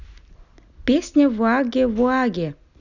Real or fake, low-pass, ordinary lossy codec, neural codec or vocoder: real; 7.2 kHz; none; none